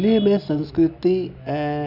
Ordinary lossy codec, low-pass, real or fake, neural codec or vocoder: none; 5.4 kHz; real; none